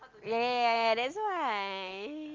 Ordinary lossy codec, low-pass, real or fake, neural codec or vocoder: Opus, 32 kbps; 7.2 kHz; real; none